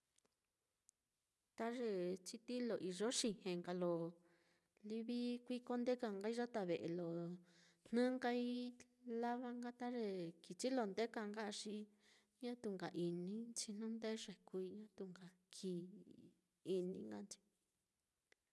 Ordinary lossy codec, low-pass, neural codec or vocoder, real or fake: none; none; none; real